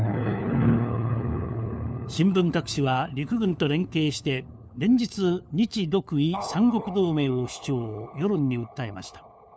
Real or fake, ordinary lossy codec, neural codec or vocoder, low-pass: fake; none; codec, 16 kHz, 4 kbps, FunCodec, trained on LibriTTS, 50 frames a second; none